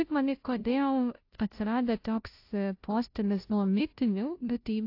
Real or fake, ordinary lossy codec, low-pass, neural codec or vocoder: fake; AAC, 32 kbps; 5.4 kHz; codec, 16 kHz, 0.5 kbps, FunCodec, trained on Chinese and English, 25 frames a second